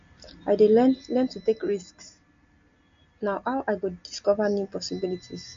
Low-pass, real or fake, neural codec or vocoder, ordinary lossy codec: 7.2 kHz; real; none; MP3, 48 kbps